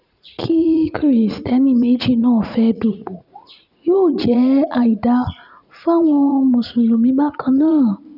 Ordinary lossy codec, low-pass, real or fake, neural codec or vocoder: none; 5.4 kHz; fake; vocoder, 44.1 kHz, 80 mel bands, Vocos